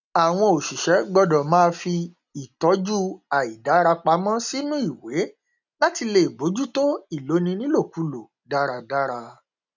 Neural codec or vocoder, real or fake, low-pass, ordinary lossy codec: none; real; 7.2 kHz; none